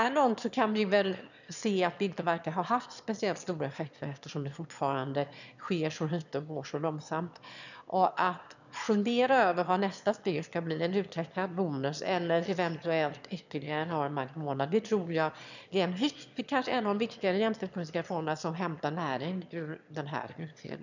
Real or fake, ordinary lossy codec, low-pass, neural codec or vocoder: fake; none; 7.2 kHz; autoencoder, 22.05 kHz, a latent of 192 numbers a frame, VITS, trained on one speaker